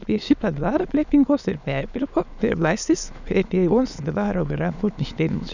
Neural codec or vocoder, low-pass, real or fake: autoencoder, 22.05 kHz, a latent of 192 numbers a frame, VITS, trained on many speakers; 7.2 kHz; fake